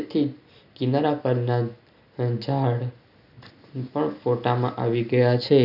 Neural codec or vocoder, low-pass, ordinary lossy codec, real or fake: none; 5.4 kHz; none; real